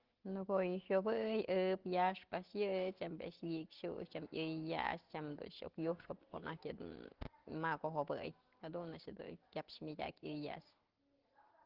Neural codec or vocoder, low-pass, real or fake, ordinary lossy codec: none; 5.4 kHz; real; Opus, 16 kbps